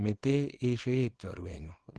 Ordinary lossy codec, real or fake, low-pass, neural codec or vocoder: Opus, 16 kbps; fake; 10.8 kHz; codec, 24 kHz, 0.9 kbps, WavTokenizer, small release